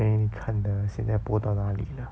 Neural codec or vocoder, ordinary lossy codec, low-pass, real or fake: none; none; none; real